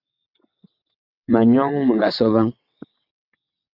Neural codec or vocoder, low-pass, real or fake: vocoder, 22.05 kHz, 80 mel bands, WaveNeXt; 5.4 kHz; fake